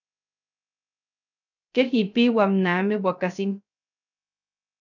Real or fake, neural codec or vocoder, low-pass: fake; codec, 16 kHz, 0.3 kbps, FocalCodec; 7.2 kHz